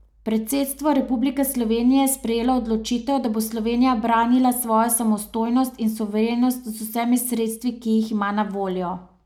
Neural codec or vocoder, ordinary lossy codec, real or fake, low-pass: none; none; real; 19.8 kHz